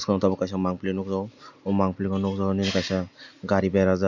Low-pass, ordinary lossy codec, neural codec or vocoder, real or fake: 7.2 kHz; none; none; real